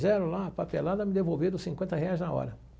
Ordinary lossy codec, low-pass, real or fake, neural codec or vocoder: none; none; real; none